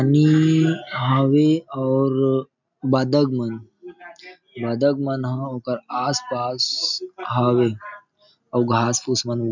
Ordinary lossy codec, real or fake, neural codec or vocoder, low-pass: none; real; none; 7.2 kHz